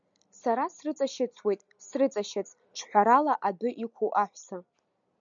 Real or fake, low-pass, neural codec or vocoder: real; 7.2 kHz; none